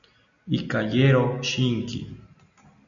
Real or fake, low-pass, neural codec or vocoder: real; 7.2 kHz; none